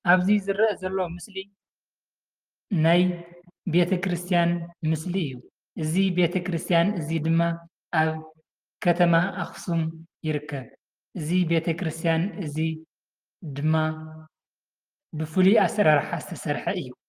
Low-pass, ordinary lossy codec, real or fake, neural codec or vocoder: 14.4 kHz; Opus, 32 kbps; real; none